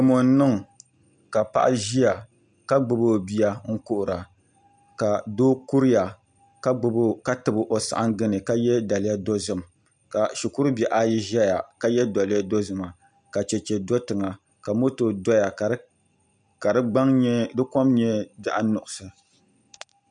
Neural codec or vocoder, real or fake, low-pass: none; real; 9.9 kHz